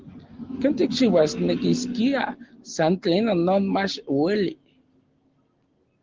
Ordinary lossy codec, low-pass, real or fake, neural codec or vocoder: Opus, 16 kbps; 7.2 kHz; real; none